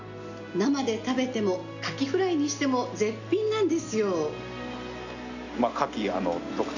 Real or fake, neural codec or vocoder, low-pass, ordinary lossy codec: real; none; 7.2 kHz; none